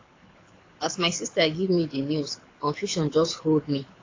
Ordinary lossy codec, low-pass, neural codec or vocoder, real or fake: AAC, 32 kbps; 7.2 kHz; codec, 24 kHz, 6 kbps, HILCodec; fake